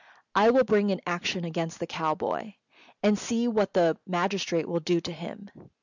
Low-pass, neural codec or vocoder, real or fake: 7.2 kHz; none; real